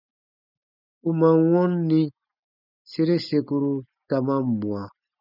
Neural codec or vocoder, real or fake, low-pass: none; real; 5.4 kHz